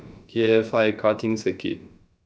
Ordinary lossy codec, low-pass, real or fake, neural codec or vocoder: none; none; fake; codec, 16 kHz, about 1 kbps, DyCAST, with the encoder's durations